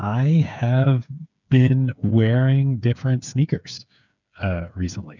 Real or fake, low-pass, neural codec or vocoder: fake; 7.2 kHz; codec, 16 kHz, 8 kbps, FreqCodec, smaller model